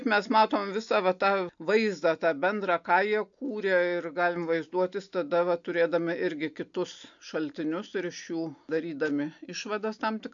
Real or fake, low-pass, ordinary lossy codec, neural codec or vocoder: real; 7.2 kHz; AAC, 64 kbps; none